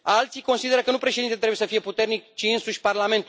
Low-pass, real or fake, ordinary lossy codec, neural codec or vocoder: none; real; none; none